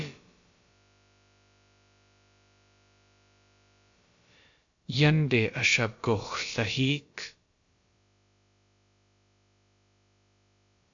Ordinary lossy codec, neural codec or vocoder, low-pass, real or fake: AAC, 64 kbps; codec, 16 kHz, about 1 kbps, DyCAST, with the encoder's durations; 7.2 kHz; fake